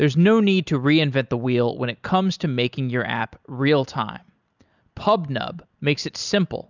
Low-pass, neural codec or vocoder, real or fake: 7.2 kHz; none; real